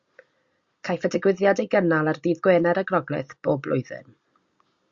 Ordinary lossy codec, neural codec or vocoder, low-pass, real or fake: Opus, 64 kbps; none; 7.2 kHz; real